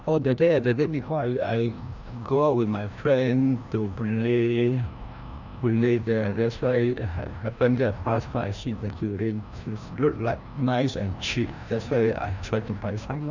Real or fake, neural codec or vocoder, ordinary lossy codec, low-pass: fake; codec, 16 kHz, 1 kbps, FreqCodec, larger model; none; 7.2 kHz